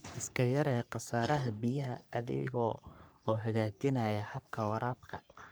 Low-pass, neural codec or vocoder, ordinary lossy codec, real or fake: none; codec, 44.1 kHz, 3.4 kbps, Pupu-Codec; none; fake